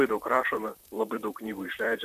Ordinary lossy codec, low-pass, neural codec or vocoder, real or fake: MP3, 96 kbps; 14.4 kHz; vocoder, 44.1 kHz, 128 mel bands, Pupu-Vocoder; fake